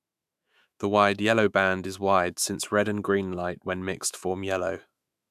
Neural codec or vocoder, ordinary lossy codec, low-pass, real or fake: autoencoder, 48 kHz, 128 numbers a frame, DAC-VAE, trained on Japanese speech; none; 14.4 kHz; fake